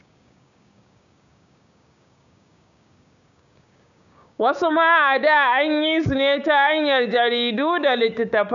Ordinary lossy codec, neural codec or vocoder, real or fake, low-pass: none; codec, 16 kHz, 6 kbps, DAC; fake; 7.2 kHz